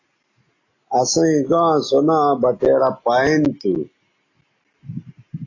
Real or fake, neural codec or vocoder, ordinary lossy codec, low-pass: real; none; AAC, 32 kbps; 7.2 kHz